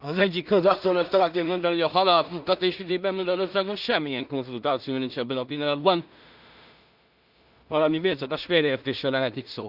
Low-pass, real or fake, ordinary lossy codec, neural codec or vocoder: 5.4 kHz; fake; Opus, 64 kbps; codec, 16 kHz in and 24 kHz out, 0.4 kbps, LongCat-Audio-Codec, two codebook decoder